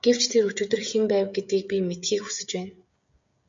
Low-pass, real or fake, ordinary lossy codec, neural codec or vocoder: 7.2 kHz; fake; MP3, 48 kbps; codec, 16 kHz, 16 kbps, FreqCodec, smaller model